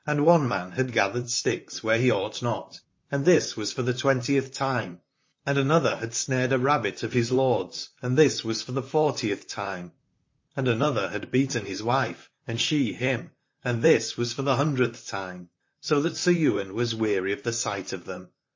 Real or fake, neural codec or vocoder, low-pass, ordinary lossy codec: fake; vocoder, 44.1 kHz, 128 mel bands, Pupu-Vocoder; 7.2 kHz; MP3, 32 kbps